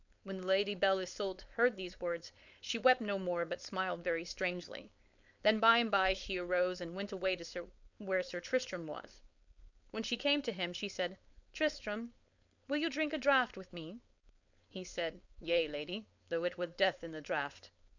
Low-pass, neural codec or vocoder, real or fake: 7.2 kHz; codec, 16 kHz, 4.8 kbps, FACodec; fake